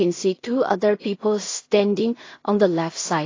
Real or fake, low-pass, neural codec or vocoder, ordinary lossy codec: fake; 7.2 kHz; codec, 16 kHz in and 24 kHz out, 0.4 kbps, LongCat-Audio-Codec, two codebook decoder; AAC, 32 kbps